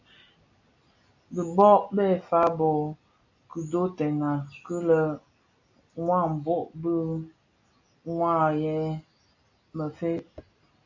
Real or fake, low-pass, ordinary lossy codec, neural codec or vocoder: real; 7.2 kHz; AAC, 48 kbps; none